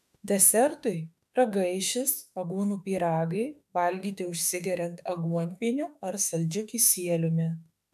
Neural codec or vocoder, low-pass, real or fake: autoencoder, 48 kHz, 32 numbers a frame, DAC-VAE, trained on Japanese speech; 14.4 kHz; fake